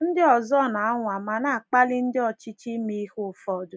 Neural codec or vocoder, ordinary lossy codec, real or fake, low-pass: none; none; real; none